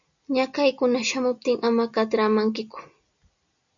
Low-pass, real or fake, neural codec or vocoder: 7.2 kHz; real; none